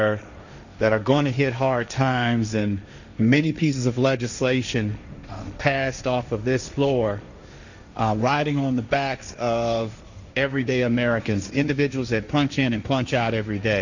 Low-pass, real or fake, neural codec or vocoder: 7.2 kHz; fake; codec, 16 kHz, 1.1 kbps, Voila-Tokenizer